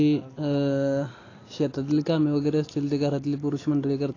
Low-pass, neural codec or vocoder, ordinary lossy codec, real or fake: 7.2 kHz; none; AAC, 32 kbps; real